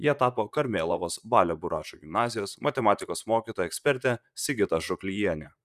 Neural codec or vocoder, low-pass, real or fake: vocoder, 44.1 kHz, 128 mel bands, Pupu-Vocoder; 14.4 kHz; fake